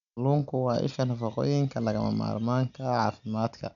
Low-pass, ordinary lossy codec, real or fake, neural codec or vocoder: 7.2 kHz; none; real; none